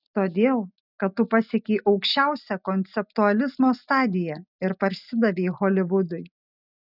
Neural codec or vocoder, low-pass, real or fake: none; 5.4 kHz; real